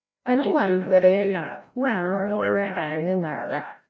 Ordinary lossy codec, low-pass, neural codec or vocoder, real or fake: none; none; codec, 16 kHz, 0.5 kbps, FreqCodec, larger model; fake